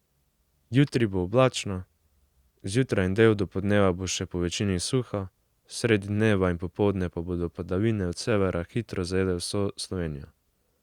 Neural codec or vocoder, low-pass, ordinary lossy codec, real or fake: none; 19.8 kHz; Opus, 64 kbps; real